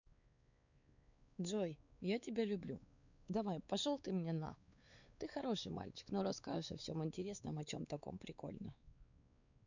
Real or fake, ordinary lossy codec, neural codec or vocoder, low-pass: fake; none; codec, 16 kHz, 4 kbps, X-Codec, WavLM features, trained on Multilingual LibriSpeech; 7.2 kHz